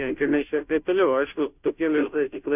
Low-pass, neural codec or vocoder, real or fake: 3.6 kHz; codec, 16 kHz, 0.5 kbps, FunCodec, trained on Chinese and English, 25 frames a second; fake